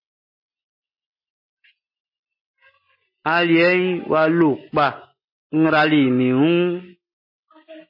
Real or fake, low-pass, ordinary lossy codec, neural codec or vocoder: real; 5.4 kHz; MP3, 24 kbps; none